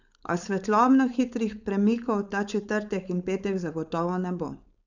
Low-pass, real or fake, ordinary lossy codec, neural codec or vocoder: 7.2 kHz; fake; none; codec, 16 kHz, 4.8 kbps, FACodec